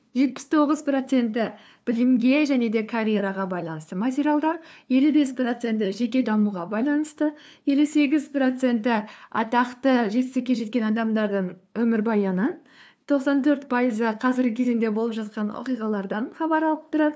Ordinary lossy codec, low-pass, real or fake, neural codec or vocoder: none; none; fake; codec, 16 kHz, 2 kbps, FunCodec, trained on LibriTTS, 25 frames a second